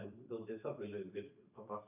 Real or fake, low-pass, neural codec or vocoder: fake; 3.6 kHz; vocoder, 44.1 kHz, 128 mel bands every 512 samples, BigVGAN v2